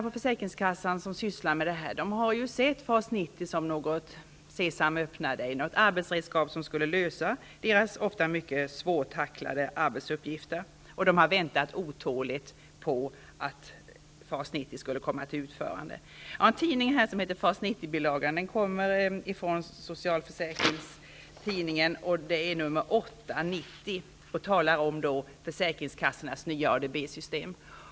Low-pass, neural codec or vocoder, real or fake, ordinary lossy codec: none; none; real; none